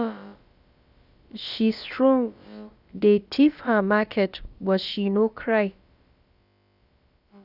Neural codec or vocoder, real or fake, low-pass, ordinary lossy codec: codec, 16 kHz, about 1 kbps, DyCAST, with the encoder's durations; fake; 5.4 kHz; Opus, 64 kbps